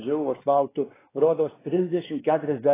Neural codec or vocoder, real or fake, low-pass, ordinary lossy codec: codec, 16 kHz, 2 kbps, X-Codec, WavLM features, trained on Multilingual LibriSpeech; fake; 3.6 kHz; AAC, 16 kbps